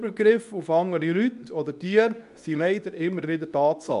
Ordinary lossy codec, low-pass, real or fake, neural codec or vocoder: none; 10.8 kHz; fake; codec, 24 kHz, 0.9 kbps, WavTokenizer, medium speech release version 2